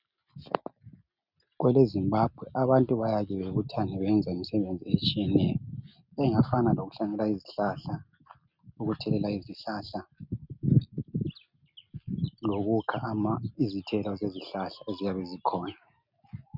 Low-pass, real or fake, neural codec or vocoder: 5.4 kHz; real; none